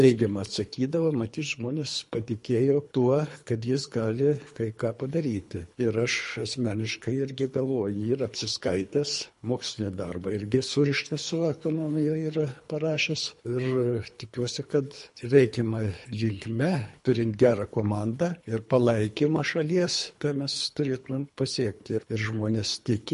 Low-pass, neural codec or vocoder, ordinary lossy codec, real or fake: 10.8 kHz; codec, 24 kHz, 3 kbps, HILCodec; MP3, 48 kbps; fake